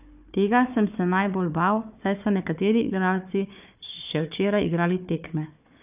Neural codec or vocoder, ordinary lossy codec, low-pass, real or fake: codec, 16 kHz, 4 kbps, FunCodec, trained on Chinese and English, 50 frames a second; none; 3.6 kHz; fake